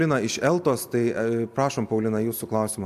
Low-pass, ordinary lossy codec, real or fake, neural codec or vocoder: 14.4 kHz; AAC, 96 kbps; real; none